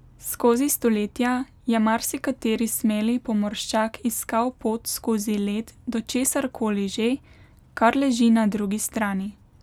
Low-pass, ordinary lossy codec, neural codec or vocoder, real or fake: 19.8 kHz; none; none; real